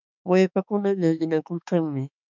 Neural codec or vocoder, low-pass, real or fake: autoencoder, 48 kHz, 32 numbers a frame, DAC-VAE, trained on Japanese speech; 7.2 kHz; fake